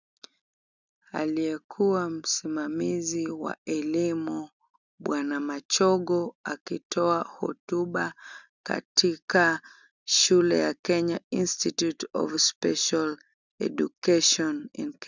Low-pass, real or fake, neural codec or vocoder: 7.2 kHz; real; none